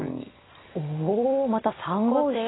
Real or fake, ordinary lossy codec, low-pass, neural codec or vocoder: real; AAC, 16 kbps; 7.2 kHz; none